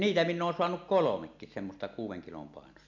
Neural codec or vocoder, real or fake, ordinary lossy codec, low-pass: none; real; MP3, 64 kbps; 7.2 kHz